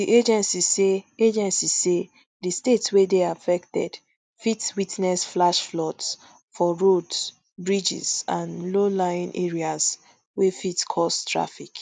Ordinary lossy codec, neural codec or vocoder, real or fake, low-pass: none; none; real; none